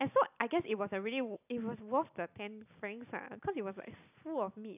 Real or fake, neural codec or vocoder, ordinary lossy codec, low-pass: real; none; none; 3.6 kHz